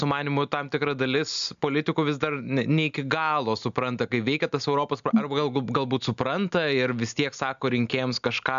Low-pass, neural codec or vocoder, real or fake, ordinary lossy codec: 7.2 kHz; none; real; AAC, 96 kbps